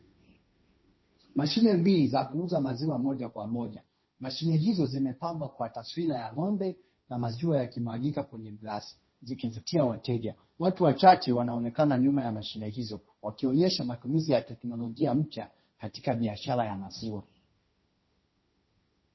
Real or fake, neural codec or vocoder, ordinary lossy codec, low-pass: fake; codec, 16 kHz, 1.1 kbps, Voila-Tokenizer; MP3, 24 kbps; 7.2 kHz